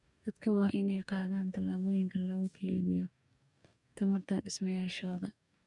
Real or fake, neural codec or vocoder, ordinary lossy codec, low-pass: fake; codec, 44.1 kHz, 2.6 kbps, DAC; none; 10.8 kHz